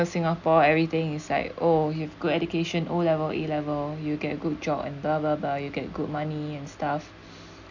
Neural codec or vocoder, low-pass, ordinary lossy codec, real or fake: none; 7.2 kHz; none; real